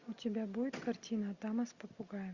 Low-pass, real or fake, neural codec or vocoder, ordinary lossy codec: 7.2 kHz; real; none; Opus, 64 kbps